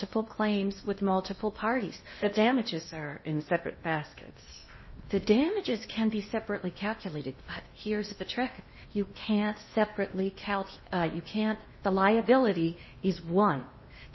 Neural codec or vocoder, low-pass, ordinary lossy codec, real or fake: codec, 16 kHz in and 24 kHz out, 0.8 kbps, FocalCodec, streaming, 65536 codes; 7.2 kHz; MP3, 24 kbps; fake